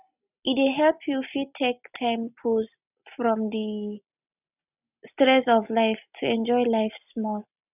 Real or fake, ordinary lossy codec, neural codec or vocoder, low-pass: real; none; none; 3.6 kHz